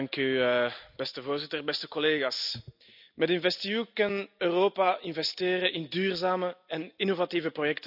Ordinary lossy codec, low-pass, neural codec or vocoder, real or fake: none; 5.4 kHz; none; real